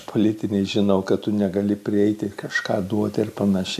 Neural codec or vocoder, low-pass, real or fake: none; 14.4 kHz; real